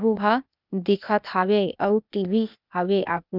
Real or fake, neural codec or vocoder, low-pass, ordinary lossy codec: fake; codec, 16 kHz, 0.8 kbps, ZipCodec; 5.4 kHz; none